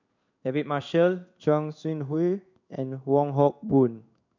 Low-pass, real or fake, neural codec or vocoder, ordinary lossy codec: 7.2 kHz; fake; codec, 16 kHz in and 24 kHz out, 1 kbps, XY-Tokenizer; none